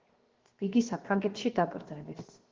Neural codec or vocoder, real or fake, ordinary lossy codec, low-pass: codec, 16 kHz, 0.7 kbps, FocalCodec; fake; Opus, 16 kbps; 7.2 kHz